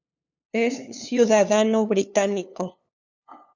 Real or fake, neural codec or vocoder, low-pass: fake; codec, 16 kHz, 2 kbps, FunCodec, trained on LibriTTS, 25 frames a second; 7.2 kHz